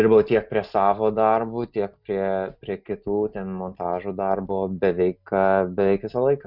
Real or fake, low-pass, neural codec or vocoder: real; 5.4 kHz; none